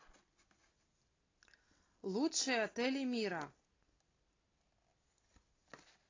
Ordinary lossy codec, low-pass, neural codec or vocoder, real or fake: AAC, 32 kbps; 7.2 kHz; none; real